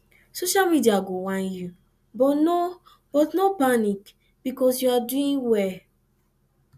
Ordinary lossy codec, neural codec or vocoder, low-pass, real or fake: none; none; 14.4 kHz; real